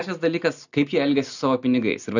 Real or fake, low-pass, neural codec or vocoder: real; 7.2 kHz; none